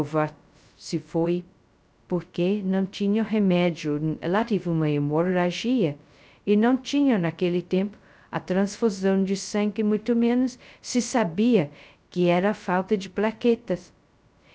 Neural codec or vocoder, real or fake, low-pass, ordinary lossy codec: codec, 16 kHz, 0.2 kbps, FocalCodec; fake; none; none